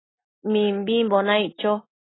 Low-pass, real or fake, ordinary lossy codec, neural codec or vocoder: 7.2 kHz; real; AAC, 16 kbps; none